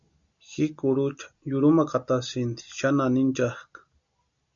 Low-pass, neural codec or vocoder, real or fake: 7.2 kHz; none; real